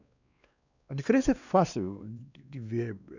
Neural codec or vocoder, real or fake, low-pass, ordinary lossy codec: codec, 16 kHz, 2 kbps, X-Codec, WavLM features, trained on Multilingual LibriSpeech; fake; 7.2 kHz; none